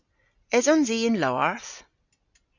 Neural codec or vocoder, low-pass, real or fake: none; 7.2 kHz; real